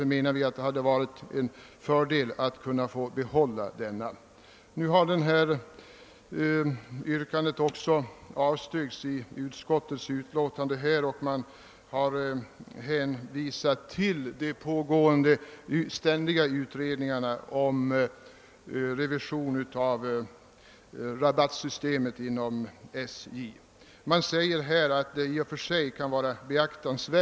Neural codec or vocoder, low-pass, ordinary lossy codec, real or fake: none; none; none; real